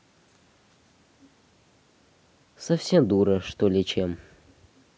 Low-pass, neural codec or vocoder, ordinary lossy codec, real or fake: none; none; none; real